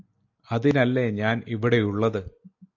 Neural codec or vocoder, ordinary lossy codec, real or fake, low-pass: none; AAC, 48 kbps; real; 7.2 kHz